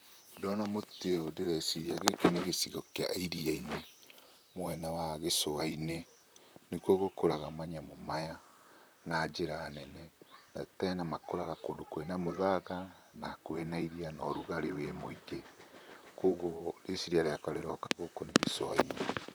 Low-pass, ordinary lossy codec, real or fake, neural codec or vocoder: none; none; fake; vocoder, 44.1 kHz, 128 mel bands, Pupu-Vocoder